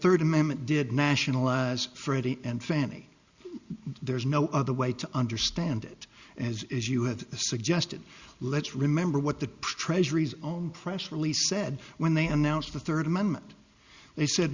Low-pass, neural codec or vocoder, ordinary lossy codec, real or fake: 7.2 kHz; none; Opus, 64 kbps; real